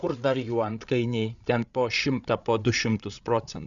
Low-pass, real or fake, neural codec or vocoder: 7.2 kHz; fake; codec, 16 kHz, 8 kbps, FreqCodec, larger model